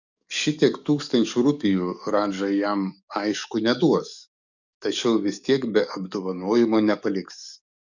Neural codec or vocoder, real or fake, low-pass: codec, 44.1 kHz, 7.8 kbps, DAC; fake; 7.2 kHz